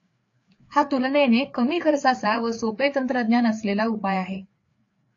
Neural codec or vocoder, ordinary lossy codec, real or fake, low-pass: codec, 16 kHz, 4 kbps, FreqCodec, larger model; AAC, 48 kbps; fake; 7.2 kHz